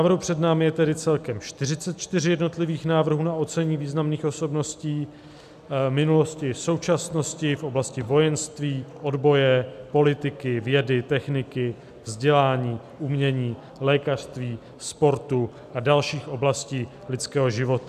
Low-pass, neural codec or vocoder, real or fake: 14.4 kHz; none; real